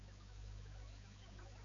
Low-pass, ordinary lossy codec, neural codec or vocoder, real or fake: 7.2 kHz; AAC, 32 kbps; codec, 16 kHz, 4 kbps, X-Codec, HuBERT features, trained on balanced general audio; fake